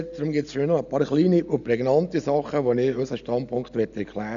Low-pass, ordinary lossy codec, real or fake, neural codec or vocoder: 7.2 kHz; none; real; none